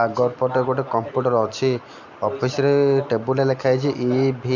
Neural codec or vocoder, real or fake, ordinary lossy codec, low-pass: none; real; none; 7.2 kHz